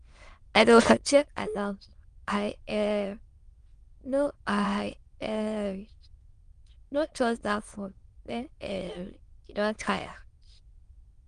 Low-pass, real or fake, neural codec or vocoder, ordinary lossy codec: 9.9 kHz; fake; autoencoder, 22.05 kHz, a latent of 192 numbers a frame, VITS, trained on many speakers; Opus, 24 kbps